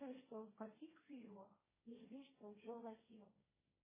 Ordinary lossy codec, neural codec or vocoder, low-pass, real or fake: MP3, 16 kbps; codec, 16 kHz, 1.1 kbps, Voila-Tokenizer; 3.6 kHz; fake